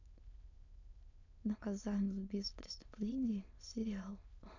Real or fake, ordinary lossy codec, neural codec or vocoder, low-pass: fake; none; autoencoder, 22.05 kHz, a latent of 192 numbers a frame, VITS, trained on many speakers; 7.2 kHz